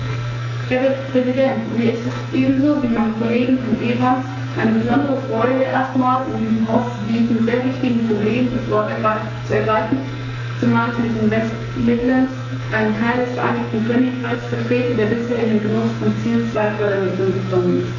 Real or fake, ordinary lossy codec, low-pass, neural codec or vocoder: fake; none; 7.2 kHz; codec, 44.1 kHz, 2.6 kbps, SNAC